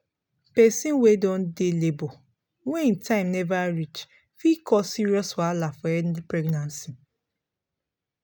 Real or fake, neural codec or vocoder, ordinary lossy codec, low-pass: real; none; none; none